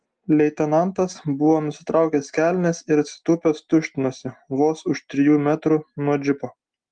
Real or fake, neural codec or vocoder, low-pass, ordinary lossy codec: real; none; 9.9 kHz; Opus, 24 kbps